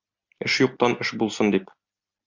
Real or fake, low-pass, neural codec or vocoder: real; 7.2 kHz; none